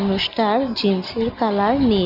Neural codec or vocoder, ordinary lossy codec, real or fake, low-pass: none; none; real; 5.4 kHz